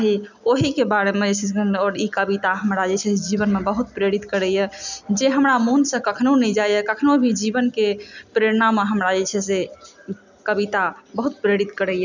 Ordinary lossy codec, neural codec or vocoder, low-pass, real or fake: none; none; 7.2 kHz; real